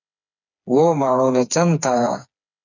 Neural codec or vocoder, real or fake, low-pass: codec, 16 kHz, 4 kbps, FreqCodec, smaller model; fake; 7.2 kHz